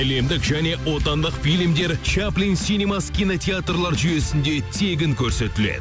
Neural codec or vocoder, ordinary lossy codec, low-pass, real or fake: none; none; none; real